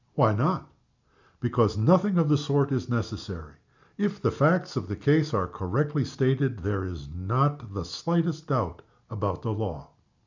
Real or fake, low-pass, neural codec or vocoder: real; 7.2 kHz; none